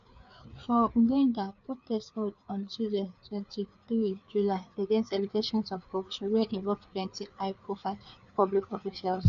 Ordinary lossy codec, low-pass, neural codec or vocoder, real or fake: none; 7.2 kHz; codec, 16 kHz, 4 kbps, FreqCodec, larger model; fake